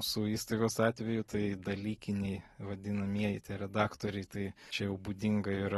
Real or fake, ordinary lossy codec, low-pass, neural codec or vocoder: real; AAC, 32 kbps; 19.8 kHz; none